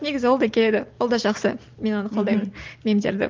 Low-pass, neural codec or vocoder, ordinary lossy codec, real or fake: 7.2 kHz; none; Opus, 32 kbps; real